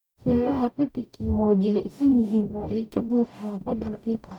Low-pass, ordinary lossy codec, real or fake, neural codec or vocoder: 19.8 kHz; none; fake; codec, 44.1 kHz, 0.9 kbps, DAC